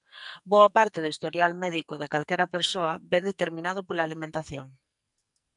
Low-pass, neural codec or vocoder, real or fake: 10.8 kHz; codec, 44.1 kHz, 2.6 kbps, SNAC; fake